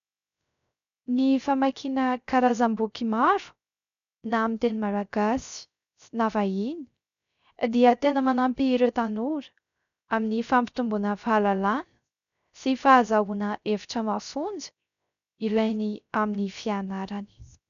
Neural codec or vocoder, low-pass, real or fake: codec, 16 kHz, 0.3 kbps, FocalCodec; 7.2 kHz; fake